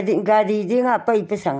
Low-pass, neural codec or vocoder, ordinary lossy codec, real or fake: none; none; none; real